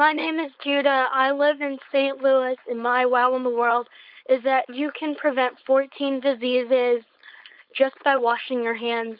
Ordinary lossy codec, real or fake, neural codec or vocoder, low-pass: Opus, 64 kbps; fake; codec, 16 kHz, 4.8 kbps, FACodec; 5.4 kHz